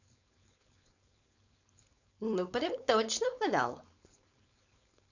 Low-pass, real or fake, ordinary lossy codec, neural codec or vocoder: 7.2 kHz; fake; none; codec, 16 kHz, 4.8 kbps, FACodec